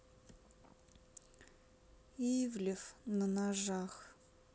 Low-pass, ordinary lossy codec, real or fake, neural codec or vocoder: none; none; real; none